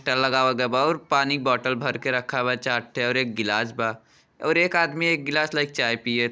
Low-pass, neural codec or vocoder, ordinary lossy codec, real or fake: none; none; none; real